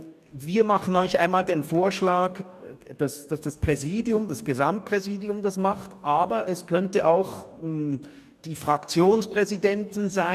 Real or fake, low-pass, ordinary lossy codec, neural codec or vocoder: fake; 14.4 kHz; none; codec, 44.1 kHz, 2.6 kbps, DAC